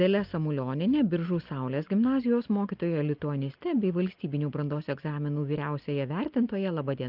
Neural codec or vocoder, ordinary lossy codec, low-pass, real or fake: none; Opus, 32 kbps; 5.4 kHz; real